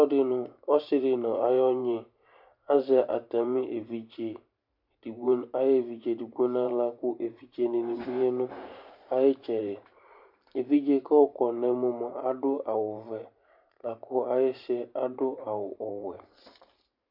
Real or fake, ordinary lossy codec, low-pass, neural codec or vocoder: fake; MP3, 48 kbps; 5.4 kHz; vocoder, 44.1 kHz, 128 mel bands every 512 samples, BigVGAN v2